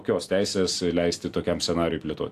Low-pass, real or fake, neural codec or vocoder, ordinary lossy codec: 14.4 kHz; real; none; AAC, 64 kbps